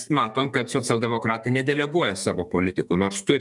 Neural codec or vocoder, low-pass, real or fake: codec, 32 kHz, 1.9 kbps, SNAC; 10.8 kHz; fake